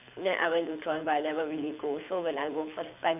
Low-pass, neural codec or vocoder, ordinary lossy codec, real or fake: 3.6 kHz; codec, 24 kHz, 6 kbps, HILCodec; none; fake